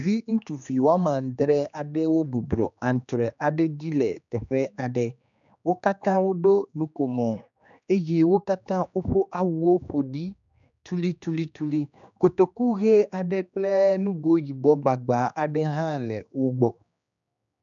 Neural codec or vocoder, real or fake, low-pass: codec, 16 kHz, 2 kbps, X-Codec, HuBERT features, trained on general audio; fake; 7.2 kHz